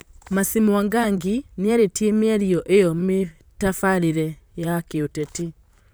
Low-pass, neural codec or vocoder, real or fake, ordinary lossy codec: none; vocoder, 44.1 kHz, 128 mel bands, Pupu-Vocoder; fake; none